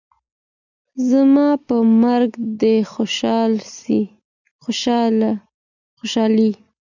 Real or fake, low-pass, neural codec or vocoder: real; 7.2 kHz; none